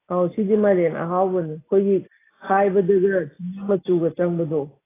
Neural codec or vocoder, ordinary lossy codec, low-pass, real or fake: none; AAC, 16 kbps; 3.6 kHz; real